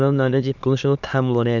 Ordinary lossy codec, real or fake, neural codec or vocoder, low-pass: none; fake; autoencoder, 22.05 kHz, a latent of 192 numbers a frame, VITS, trained on many speakers; 7.2 kHz